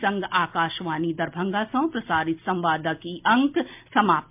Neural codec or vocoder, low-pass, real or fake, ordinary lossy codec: none; 3.6 kHz; real; MP3, 32 kbps